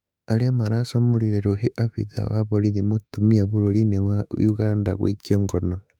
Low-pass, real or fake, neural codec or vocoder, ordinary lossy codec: 19.8 kHz; fake; autoencoder, 48 kHz, 32 numbers a frame, DAC-VAE, trained on Japanese speech; none